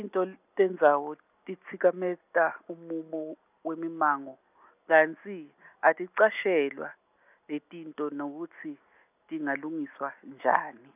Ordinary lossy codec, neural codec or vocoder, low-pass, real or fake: none; none; 3.6 kHz; real